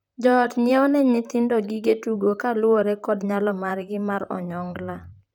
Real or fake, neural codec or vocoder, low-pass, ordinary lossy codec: fake; vocoder, 44.1 kHz, 128 mel bands, Pupu-Vocoder; 19.8 kHz; none